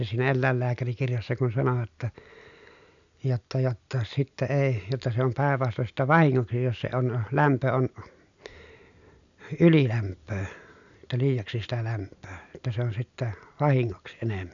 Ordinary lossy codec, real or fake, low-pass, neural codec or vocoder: none; real; 7.2 kHz; none